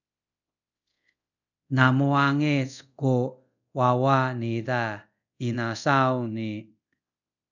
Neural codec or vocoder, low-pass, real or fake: codec, 24 kHz, 0.5 kbps, DualCodec; 7.2 kHz; fake